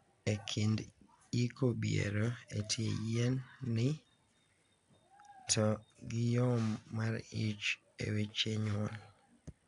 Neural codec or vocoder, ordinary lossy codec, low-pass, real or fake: none; none; 10.8 kHz; real